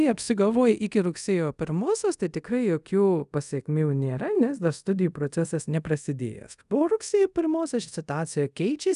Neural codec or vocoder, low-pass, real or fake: codec, 24 kHz, 0.5 kbps, DualCodec; 10.8 kHz; fake